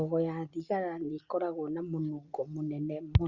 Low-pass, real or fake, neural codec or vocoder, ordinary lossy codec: 7.2 kHz; real; none; Opus, 64 kbps